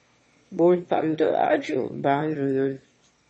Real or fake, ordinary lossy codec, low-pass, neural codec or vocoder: fake; MP3, 32 kbps; 9.9 kHz; autoencoder, 22.05 kHz, a latent of 192 numbers a frame, VITS, trained on one speaker